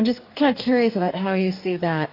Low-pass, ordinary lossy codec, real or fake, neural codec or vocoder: 5.4 kHz; AAC, 32 kbps; fake; codec, 44.1 kHz, 2.6 kbps, DAC